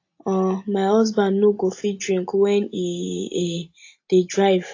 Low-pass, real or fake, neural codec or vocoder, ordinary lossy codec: 7.2 kHz; real; none; AAC, 48 kbps